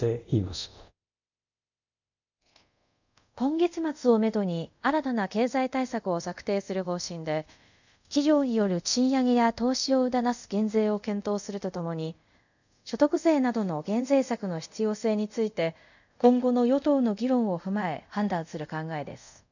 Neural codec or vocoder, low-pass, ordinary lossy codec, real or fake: codec, 24 kHz, 0.5 kbps, DualCodec; 7.2 kHz; none; fake